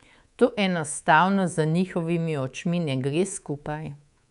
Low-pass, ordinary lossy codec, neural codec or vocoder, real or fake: 10.8 kHz; none; codec, 24 kHz, 3.1 kbps, DualCodec; fake